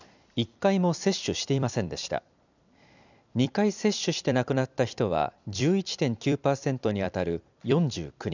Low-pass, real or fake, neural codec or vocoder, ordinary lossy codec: 7.2 kHz; fake; vocoder, 44.1 kHz, 128 mel bands every 256 samples, BigVGAN v2; none